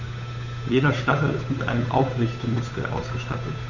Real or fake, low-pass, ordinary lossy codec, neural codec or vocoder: fake; 7.2 kHz; none; codec, 16 kHz, 8 kbps, FunCodec, trained on Chinese and English, 25 frames a second